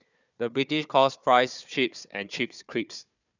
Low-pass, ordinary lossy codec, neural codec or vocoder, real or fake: 7.2 kHz; none; codec, 16 kHz, 4 kbps, FunCodec, trained on Chinese and English, 50 frames a second; fake